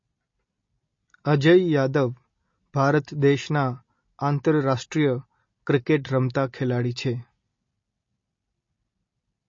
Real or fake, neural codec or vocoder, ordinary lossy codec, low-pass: real; none; MP3, 32 kbps; 7.2 kHz